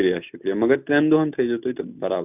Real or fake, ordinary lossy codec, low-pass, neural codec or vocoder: real; none; 3.6 kHz; none